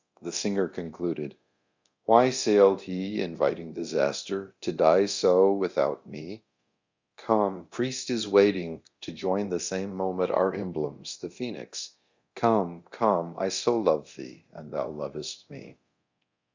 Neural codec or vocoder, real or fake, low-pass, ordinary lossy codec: codec, 24 kHz, 0.9 kbps, DualCodec; fake; 7.2 kHz; Opus, 64 kbps